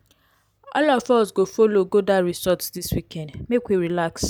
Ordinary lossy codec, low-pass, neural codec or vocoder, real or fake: none; none; none; real